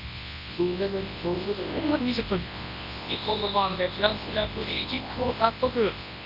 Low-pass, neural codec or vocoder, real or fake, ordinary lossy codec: 5.4 kHz; codec, 24 kHz, 0.9 kbps, WavTokenizer, large speech release; fake; none